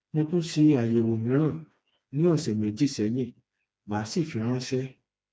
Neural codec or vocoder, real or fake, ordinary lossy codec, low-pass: codec, 16 kHz, 2 kbps, FreqCodec, smaller model; fake; none; none